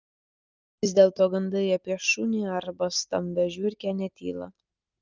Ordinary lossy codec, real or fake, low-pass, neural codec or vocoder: Opus, 24 kbps; real; 7.2 kHz; none